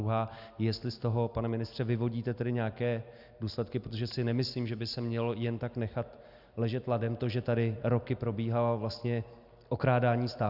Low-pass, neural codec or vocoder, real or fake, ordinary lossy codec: 5.4 kHz; none; real; Opus, 64 kbps